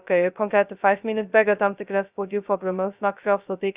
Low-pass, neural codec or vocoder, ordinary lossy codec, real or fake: 3.6 kHz; codec, 16 kHz, 0.2 kbps, FocalCodec; Opus, 64 kbps; fake